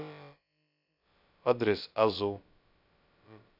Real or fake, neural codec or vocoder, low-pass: fake; codec, 16 kHz, about 1 kbps, DyCAST, with the encoder's durations; 5.4 kHz